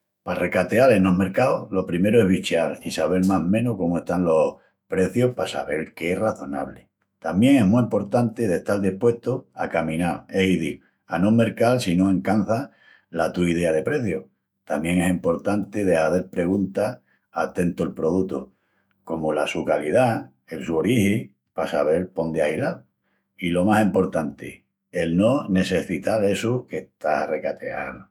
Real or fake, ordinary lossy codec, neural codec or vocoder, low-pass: fake; none; autoencoder, 48 kHz, 128 numbers a frame, DAC-VAE, trained on Japanese speech; 19.8 kHz